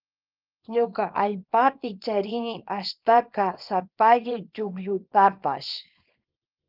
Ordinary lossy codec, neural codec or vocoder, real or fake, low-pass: Opus, 24 kbps; codec, 24 kHz, 0.9 kbps, WavTokenizer, small release; fake; 5.4 kHz